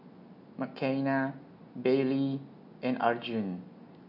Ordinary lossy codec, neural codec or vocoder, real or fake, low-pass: MP3, 48 kbps; none; real; 5.4 kHz